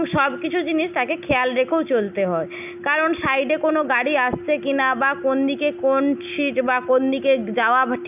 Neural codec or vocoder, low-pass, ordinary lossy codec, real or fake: none; 3.6 kHz; none; real